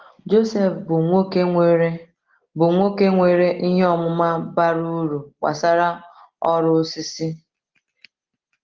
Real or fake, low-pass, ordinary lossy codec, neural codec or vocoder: real; 7.2 kHz; Opus, 16 kbps; none